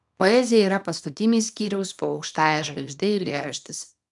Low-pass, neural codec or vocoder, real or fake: 10.8 kHz; codec, 24 kHz, 0.9 kbps, WavTokenizer, small release; fake